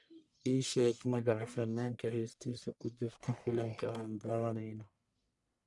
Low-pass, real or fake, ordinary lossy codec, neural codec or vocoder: 10.8 kHz; fake; none; codec, 44.1 kHz, 1.7 kbps, Pupu-Codec